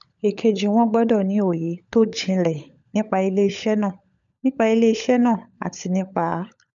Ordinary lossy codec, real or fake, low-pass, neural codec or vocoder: none; fake; 7.2 kHz; codec, 16 kHz, 16 kbps, FunCodec, trained on LibriTTS, 50 frames a second